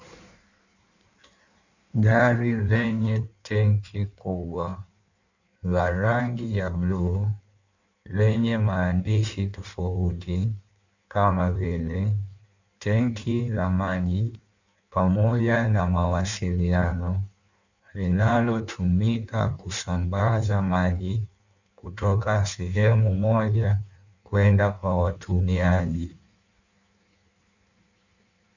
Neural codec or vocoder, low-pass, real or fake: codec, 16 kHz in and 24 kHz out, 1.1 kbps, FireRedTTS-2 codec; 7.2 kHz; fake